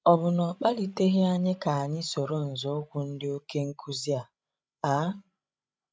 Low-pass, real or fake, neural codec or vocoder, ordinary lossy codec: none; fake; codec, 16 kHz, 16 kbps, FreqCodec, larger model; none